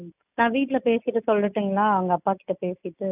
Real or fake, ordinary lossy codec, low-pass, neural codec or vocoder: real; none; 3.6 kHz; none